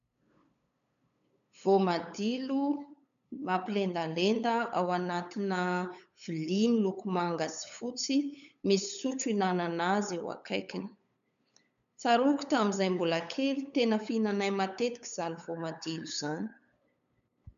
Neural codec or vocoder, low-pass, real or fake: codec, 16 kHz, 8 kbps, FunCodec, trained on LibriTTS, 25 frames a second; 7.2 kHz; fake